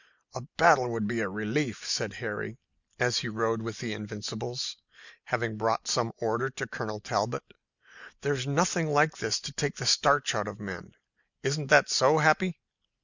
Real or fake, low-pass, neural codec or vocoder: real; 7.2 kHz; none